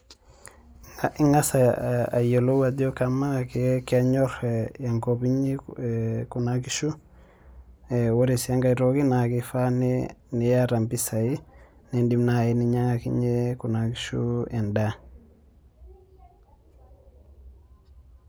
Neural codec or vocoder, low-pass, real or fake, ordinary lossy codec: none; none; real; none